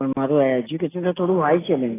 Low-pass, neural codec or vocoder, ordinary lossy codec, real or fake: 3.6 kHz; none; AAC, 16 kbps; real